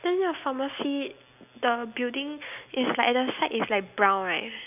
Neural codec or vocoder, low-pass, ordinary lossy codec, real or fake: none; 3.6 kHz; none; real